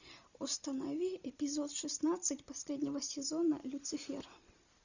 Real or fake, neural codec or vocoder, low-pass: real; none; 7.2 kHz